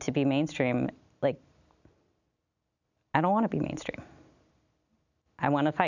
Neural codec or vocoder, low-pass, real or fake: none; 7.2 kHz; real